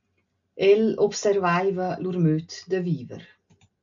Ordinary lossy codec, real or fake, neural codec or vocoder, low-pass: AAC, 64 kbps; real; none; 7.2 kHz